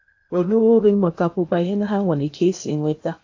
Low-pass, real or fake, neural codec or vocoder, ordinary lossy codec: 7.2 kHz; fake; codec, 16 kHz in and 24 kHz out, 0.8 kbps, FocalCodec, streaming, 65536 codes; AAC, 48 kbps